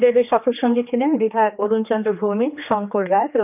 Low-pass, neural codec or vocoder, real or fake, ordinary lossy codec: 3.6 kHz; codec, 16 kHz, 2 kbps, X-Codec, HuBERT features, trained on general audio; fake; none